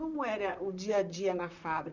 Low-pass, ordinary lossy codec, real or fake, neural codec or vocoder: 7.2 kHz; none; fake; vocoder, 44.1 kHz, 128 mel bands, Pupu-Vocoder